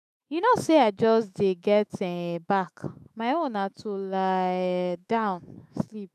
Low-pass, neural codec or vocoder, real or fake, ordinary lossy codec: 14.4 kHz; autoencoder, 48 kHz, 128 numbers a frame, DAC-VAE, trained on Japanese speech; fake; none